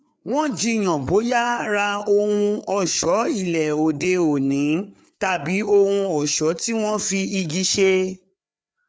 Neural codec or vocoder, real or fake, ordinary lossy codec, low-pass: codec, 16 kHz, 4 kbps, FreqCodec, larger model; fake; none; none